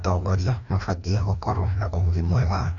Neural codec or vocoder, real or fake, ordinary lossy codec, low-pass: codec, 16 kHz, 1 kbps, FreqCodec, larger model; fake; none; 7.2 kHz